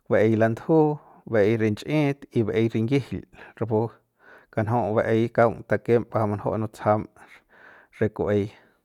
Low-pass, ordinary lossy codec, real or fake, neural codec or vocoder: 19.8 kHz; none; real; none